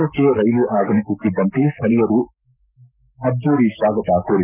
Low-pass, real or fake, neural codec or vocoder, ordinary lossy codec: 3.6 kHz; fake; codec, 44.1 kHz, 7.8 kbps, Pupu-Codec; none